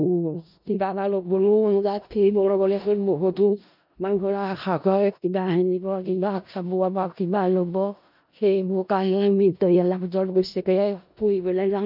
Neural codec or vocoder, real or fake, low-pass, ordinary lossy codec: codec, 16 kHz in and 24 kHz out, 0.4 kbps, LongCat-Audio-Codec, four codebook decoder; fake; 5.4 kHz; none